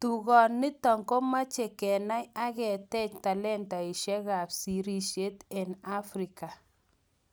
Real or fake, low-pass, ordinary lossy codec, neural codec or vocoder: real; none; none; none